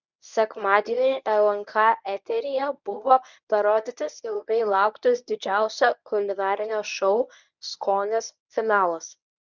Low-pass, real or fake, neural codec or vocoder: 7.2 kHz; fake; codec, 24 kHz, 0.9 kbps, WavTokenizer, medium speech release version 1